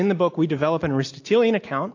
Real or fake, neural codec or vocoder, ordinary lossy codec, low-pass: real; none; AAC, 48 kbps; 7.2 kHz